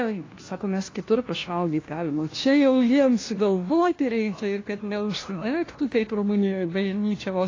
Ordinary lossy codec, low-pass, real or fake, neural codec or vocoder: AAC, 32 kbps; 7.2 kHz; fake; codec, 16 kHz, 1 kbps, FunCodec, trained on LibriTTS, 50 frames a second